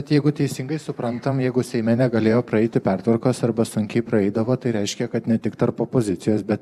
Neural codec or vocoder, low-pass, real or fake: vocoder, 44.1 kHz, 128 mel bands every 512 samples, BigVGAN v2; 14.4 kHz; fake